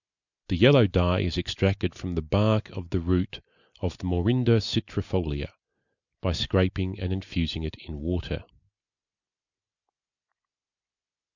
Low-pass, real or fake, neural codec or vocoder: 7.2 kHz; real; none